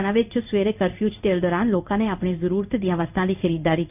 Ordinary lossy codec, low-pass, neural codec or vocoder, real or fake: none; 3.6 kHz; codec, 16 kHz in and 24 kHz out, 1 kbps, XY-Tokenizer; fake